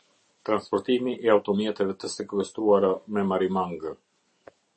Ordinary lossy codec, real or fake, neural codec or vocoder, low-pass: MP3, 32 kbps; real; none; 10.8 kHz